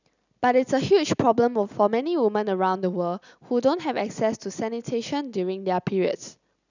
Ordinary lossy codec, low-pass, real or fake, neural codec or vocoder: none; 7.2 kHz; real; none